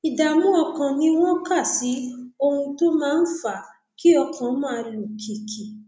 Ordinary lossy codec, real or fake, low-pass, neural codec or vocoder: none; real; none; none